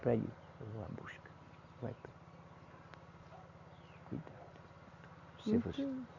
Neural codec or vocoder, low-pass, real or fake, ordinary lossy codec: none; 7.2 kHz; real; none